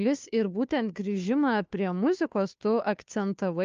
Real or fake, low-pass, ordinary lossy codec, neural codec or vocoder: fake; 7.2 kHz; Opus, 24 kbps; codec, 16 kHz, 2 kbps, FunCodec, trained on Chinese and English, 25 frames a second